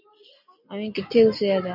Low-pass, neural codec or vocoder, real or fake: 5.4 kHz; none; real